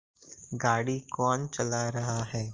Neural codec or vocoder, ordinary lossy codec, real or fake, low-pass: none; Opus, 24 kbps; real; 7.2 kHz